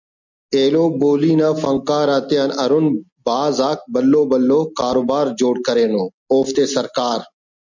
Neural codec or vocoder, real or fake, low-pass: none; real; 7.2 kHz